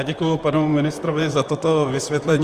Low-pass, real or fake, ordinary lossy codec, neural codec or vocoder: 14.4 kHz; fake; Opus, 32 kbps; vocoder, 44.1 kHz, 128 mel bands, Pupu-Vocoder